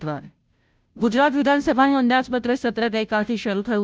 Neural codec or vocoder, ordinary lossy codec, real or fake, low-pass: codec, 16 kHz, 0.5 kbps, FunCodec, trained on Chinese and English, 25 frames a second; none; fake; none